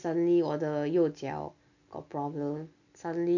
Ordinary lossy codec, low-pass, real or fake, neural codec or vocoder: none; 7.2 kHz; real; none